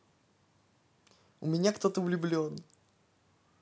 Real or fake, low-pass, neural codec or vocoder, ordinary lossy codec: real; none; none; none